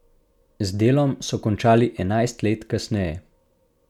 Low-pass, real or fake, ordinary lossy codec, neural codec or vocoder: 19.8 kHz; real; none; none